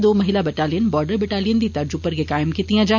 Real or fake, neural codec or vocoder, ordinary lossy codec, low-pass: real; none; none; 7.2 kHz